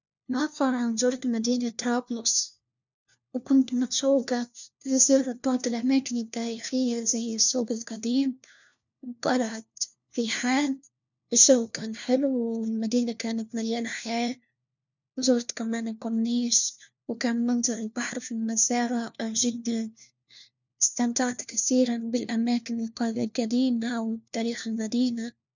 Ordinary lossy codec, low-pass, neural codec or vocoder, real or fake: none; 7.2 kHz; codec, 16 kHz, 1 kbps, FunCodec, trained on LibriTTS, 50 frames a second; fake